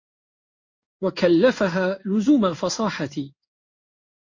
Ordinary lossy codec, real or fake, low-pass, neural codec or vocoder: MP3, 32 kbps; fake; 7.2 kHz; codec, 16 kHz in and 24 kHz out, 1 kbps, XY-Tokenizer